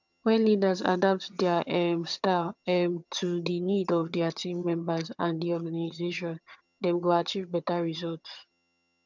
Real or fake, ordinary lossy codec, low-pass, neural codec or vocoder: fake; none; 7.2 kHz; vocoder, 22.05 kHz, 80 mel bands, HiFi-GAN